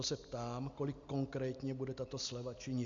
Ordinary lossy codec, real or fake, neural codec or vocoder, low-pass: MP3, 64 kbps; real; none; 7.2 kHz